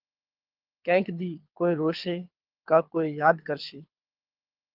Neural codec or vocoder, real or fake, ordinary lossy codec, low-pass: codec, 24 kHz, 6 kbps, HILCodec; fake; Opus, 24 kbps; 5.4 kHz